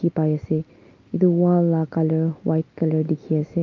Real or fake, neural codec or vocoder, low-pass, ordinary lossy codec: real; none; 7.2 kHz; Opus, 24 kbps